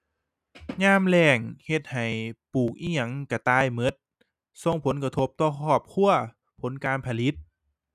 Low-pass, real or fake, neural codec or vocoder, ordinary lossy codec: 14.4 kHz; real; none; none